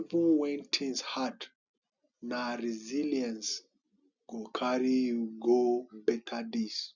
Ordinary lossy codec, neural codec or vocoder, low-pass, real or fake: none; none; 7.2 kHz; real